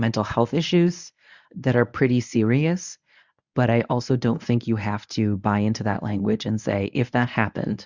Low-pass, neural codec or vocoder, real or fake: 7.2 kHz; codec, 24 kHz, 0.9 kbps, WavTokenizer, medium speech release version 1; fake